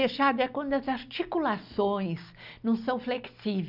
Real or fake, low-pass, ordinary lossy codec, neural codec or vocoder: real; 5.4 kHz; none; none